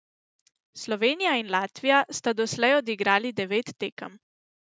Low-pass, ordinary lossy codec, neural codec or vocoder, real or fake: none; none; none; real